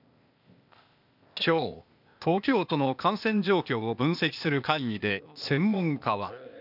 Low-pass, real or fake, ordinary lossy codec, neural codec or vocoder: 5.4 kHz; fake; none; codec, 16 kHz, 0.8 kbps, ZipCodec